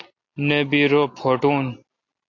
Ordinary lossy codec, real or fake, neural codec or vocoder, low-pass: AAC, 48 kbps; real; none; 7.2 kHz